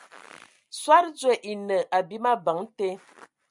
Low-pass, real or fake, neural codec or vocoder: 10.8 kHz; real; none